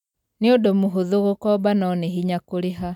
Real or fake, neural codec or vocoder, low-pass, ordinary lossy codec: real; none; 19.8 kHz; none